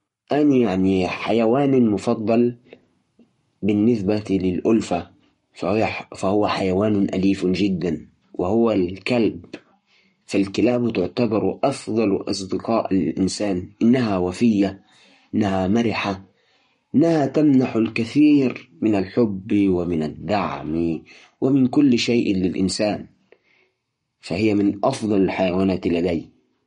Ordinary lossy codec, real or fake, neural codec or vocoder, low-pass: MP3, 48 kbps; fake; codec, 44.1 kHz, 7.8 kbps, Pupu-Codec; 19.8 kHz